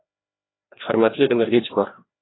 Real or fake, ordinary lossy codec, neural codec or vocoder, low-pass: fake; AAC, 16 kbps; codec, 16 kHz, 1 kbps, FreqCodec, larger model; 7.2 kHz